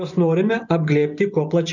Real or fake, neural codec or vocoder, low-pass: fake; vocoder, 44.1 kHz, 128 mel bands every 512 samples, BigVGAN v2; 7.2 kHz